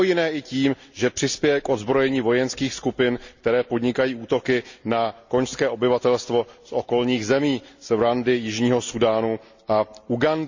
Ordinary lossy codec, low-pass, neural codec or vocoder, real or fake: Opus, 64 kbps; 7.2 kHz; none; real